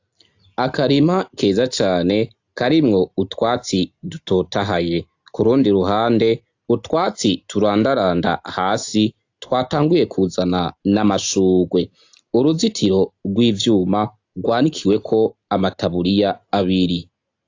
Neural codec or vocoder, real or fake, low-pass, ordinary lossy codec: none; real; 7.2 kHz; AAC, 48 kbps